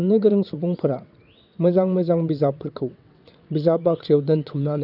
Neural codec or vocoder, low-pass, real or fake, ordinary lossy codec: vocoder, 22.05 kHz, 80 mel bands, WaveNeXt; 5.4 kHz; fake; none